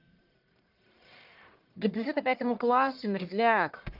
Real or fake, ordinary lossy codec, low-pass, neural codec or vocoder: fake; Opus, 24 kbps; 5.4 kHz; codec, 44.1 kHz, 1.7 kbps, Pupu-Codec